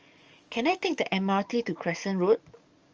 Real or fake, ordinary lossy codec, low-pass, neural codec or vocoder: fake; Opus, 24 kbps; 7.2 kHz; codec, 44.1 kHz, 7.8 kbps, DAC